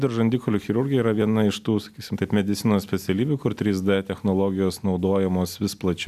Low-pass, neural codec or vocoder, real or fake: 14.4 kHz; none; real